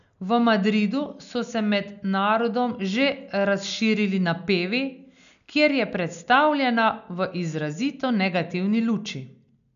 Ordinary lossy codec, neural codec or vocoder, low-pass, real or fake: none; none; 7.2 kHz; real